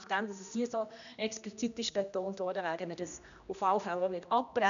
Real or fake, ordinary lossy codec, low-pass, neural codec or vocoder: fake; none; 7.2 kHz; codec, 16 kHz, 1 kbps, X-Codec, HuBERT features, trained on general audio